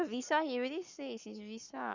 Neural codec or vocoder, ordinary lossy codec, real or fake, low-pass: codec, 44.1 kHz, 7.8 kbps, Pupu-Codec; none; fake; 7.2 kHz